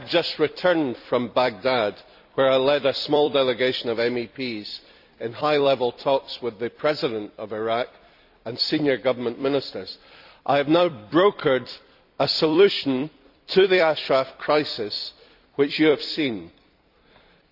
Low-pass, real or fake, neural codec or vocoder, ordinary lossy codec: 5.4 kHz; fake; vocoder, 44.1 kHz, 128 mel bands every 256 samples, BigVGAN v2; none